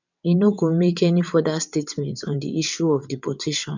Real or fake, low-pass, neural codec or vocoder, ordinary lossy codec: fake; 7.2 kHz; vocoder, 22.05 kHz, 80 mel bands, WaveNeXt; none